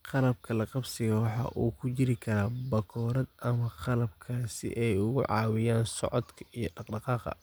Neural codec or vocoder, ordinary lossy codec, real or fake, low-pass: none; none; real; none